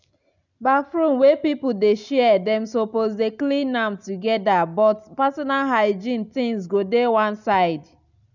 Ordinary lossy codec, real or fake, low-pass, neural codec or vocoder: none; real; 7.2 kHz; none